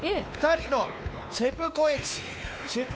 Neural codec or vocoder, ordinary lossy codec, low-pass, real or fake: codec, 16 kHz, 2 kbps, X-Codec, WavLM features, trained on Multilingual LibriSpeech; none; none; fake